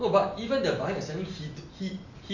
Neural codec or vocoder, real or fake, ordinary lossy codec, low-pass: none; real; none; 7.2 kHz